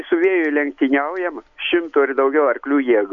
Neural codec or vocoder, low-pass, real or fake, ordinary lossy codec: none; 7.2 kHz; real; MP3, 64 kbps